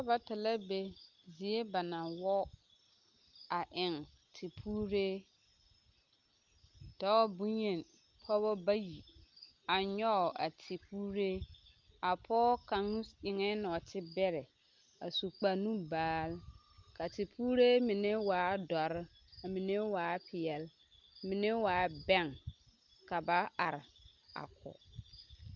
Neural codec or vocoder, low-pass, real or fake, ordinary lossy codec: none; 7.2 kHz; real; Opus, 32 kbps